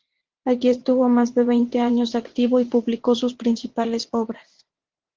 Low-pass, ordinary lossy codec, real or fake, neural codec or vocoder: 7.2 kHz; Opus, 16 kbps; fake; vocoder, 44.1 kHz, 80 mel bands, Vocos